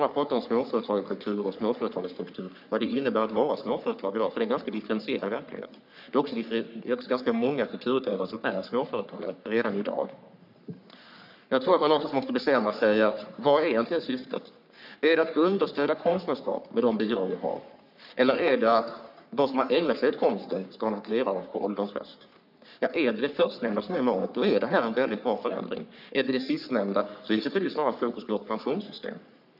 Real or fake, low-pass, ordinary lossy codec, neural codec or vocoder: fake; 5.4 kHz; none; codec, 44.1 kHz, 3.4 kbps, Pupu-Codec